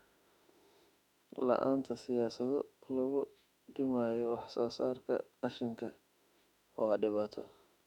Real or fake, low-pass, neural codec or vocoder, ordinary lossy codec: fake; 19.8 kHz; autoencoder, 48 kHz, 32 numbers a frame, DAC-VAE, trained on Japanese speech; none